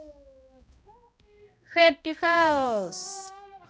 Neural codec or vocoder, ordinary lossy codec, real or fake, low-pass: codec, 16 kHz, 0.5 kbps, X-Codec, HuBERT features, trained on balanced general audio; none; fake; none